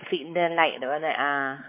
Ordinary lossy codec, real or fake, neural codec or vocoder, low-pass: MP3, 24 kbps; fake; codec, 16 kHz, 4 kbps, X-Codec, WavLM features, trained on Multilingual LibriSpeech; 3.6 kHz